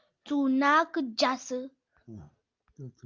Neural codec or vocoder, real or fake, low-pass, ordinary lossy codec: none; real; 7.2 kHz; Opus, 32 kbps